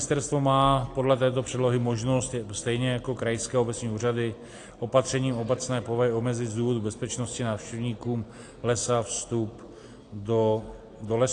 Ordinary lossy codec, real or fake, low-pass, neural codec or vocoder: AAC, 48 kbps; real; 9.9 kHz; none